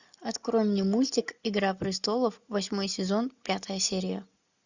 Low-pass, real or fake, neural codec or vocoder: 7.2 kHz; real; none